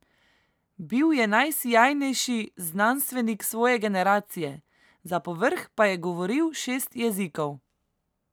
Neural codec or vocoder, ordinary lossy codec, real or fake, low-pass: none; none; real; none